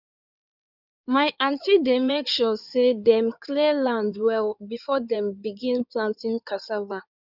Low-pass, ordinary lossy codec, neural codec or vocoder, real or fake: 5.4 kHz; none; codec, 16 kHz in and 24 kHz out, 2.2 kbps, FireRedTTS-2 codec; fake